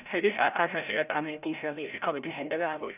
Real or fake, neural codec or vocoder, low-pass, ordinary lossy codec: fake; codec, 16 kHz, 0.5 kbps, FreqCodec, larger model; 3.6 kHz; none